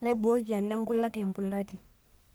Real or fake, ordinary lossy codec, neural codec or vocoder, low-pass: fake; none; codec, 44.1 kHz, 1.7 kbps, Pupu-Codec; none